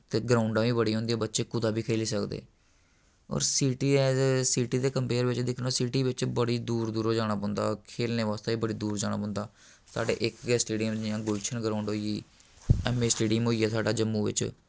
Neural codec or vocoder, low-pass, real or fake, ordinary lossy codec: none; none; real; none